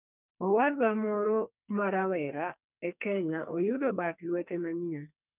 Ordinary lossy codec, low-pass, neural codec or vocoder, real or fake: none; 3.6 kHz; codec, 44.1 kHz, 2.6 kbps, SNAC; fake